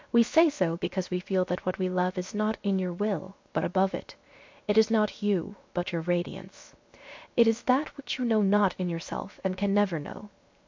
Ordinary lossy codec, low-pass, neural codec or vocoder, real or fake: MP3, 48 kbps; 7.2 kHz; codec, 16 kHz, 0.7 kbps, FocalCodec; fake